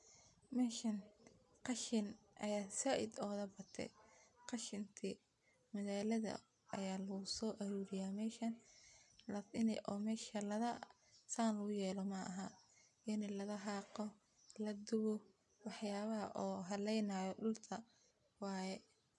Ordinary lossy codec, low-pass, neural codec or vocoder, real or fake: none; 10.8 kHz; none; real